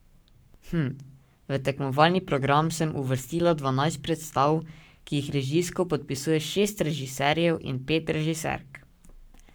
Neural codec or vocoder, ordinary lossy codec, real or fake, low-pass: codec, 44.1 kHz, 7.8 kbps, Pupu-Codec; none; fake; none